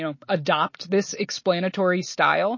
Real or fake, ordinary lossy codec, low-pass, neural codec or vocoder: real; MP3, 32 kbps; 7.2 kHz; none